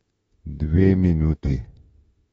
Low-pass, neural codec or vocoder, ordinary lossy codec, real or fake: 19.8 kHz; autoencoder, 48 kHz, 32 numbers a frame, DAC-VAE, trained on Japanese speech; AAC, 24 kbps; fake